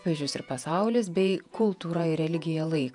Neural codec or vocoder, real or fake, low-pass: vocoder, 48 kHz, 128 mel bands, Vocos; fake; 10.8 kHz